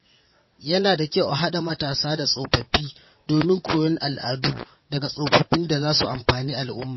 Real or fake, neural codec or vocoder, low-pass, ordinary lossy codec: fake; vocoder, 22.05 kHz, 80 mel bands, WaveNeXt; 7.2 kHz; MP3, 24 kbps